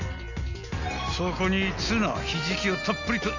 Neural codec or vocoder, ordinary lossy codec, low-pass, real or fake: none; none; 7.2 kHz; real